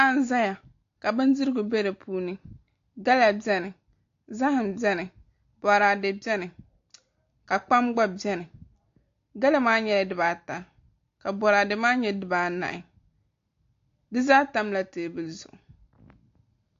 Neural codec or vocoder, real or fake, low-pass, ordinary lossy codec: none; real; 7.2 kHz; AAC, 48 kbps